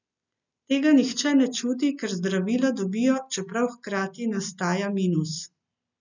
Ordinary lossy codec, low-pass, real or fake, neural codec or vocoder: none; 7.2 kHz; real; none